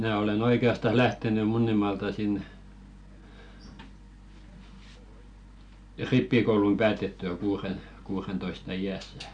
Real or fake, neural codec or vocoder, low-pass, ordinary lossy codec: real; none; 9.9 kHz; none